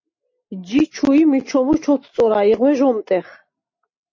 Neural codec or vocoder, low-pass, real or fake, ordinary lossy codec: none; 7.2 kHz; real; MP3, 32 kbps